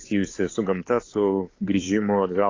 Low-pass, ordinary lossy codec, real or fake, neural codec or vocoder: 7.2 kHz; AAC, 32 kbps; fake; codec, 16 kHz, 8 kbps, FunCodec, trained on Chinese and English, 25 frames a second